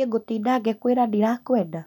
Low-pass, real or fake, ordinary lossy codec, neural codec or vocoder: 19.8 kHz; real; none; none